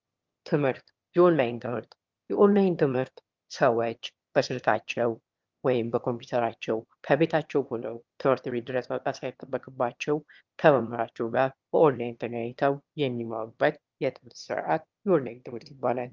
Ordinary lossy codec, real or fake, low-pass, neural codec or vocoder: Opus, 24 kbps; fake; 7.2 kHz; autoencoder, 22.05 kHz, a latent of 192 numbers a frame, VITS, trained on one speaker